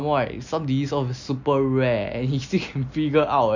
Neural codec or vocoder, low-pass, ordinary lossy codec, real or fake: none; 7.2 kHz; none; real